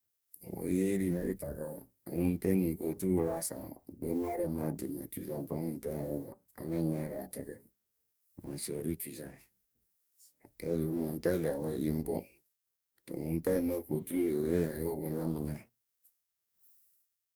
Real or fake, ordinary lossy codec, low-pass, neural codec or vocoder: fake; none; none; codec, 44.1 kHz, 2.6 kbps, DAC